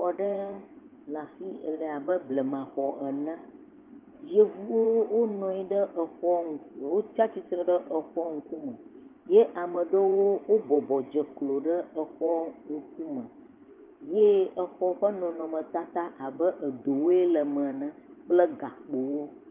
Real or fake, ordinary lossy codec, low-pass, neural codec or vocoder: fake; Opus, 24 kbps; 3.6 kHz; vocoder, 24 kHz, 100 mel bands, Vocos